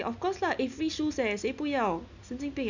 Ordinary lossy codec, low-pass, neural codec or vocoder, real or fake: none; 7.2 kHz; none; real